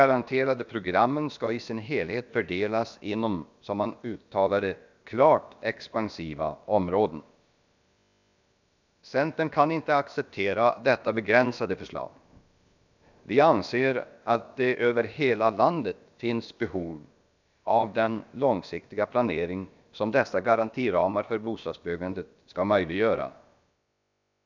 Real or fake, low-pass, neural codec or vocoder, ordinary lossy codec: fake; 7.2 kHz; codec, 16 kHz, about 1 kbps, DyCAST, with the encoder's durations; none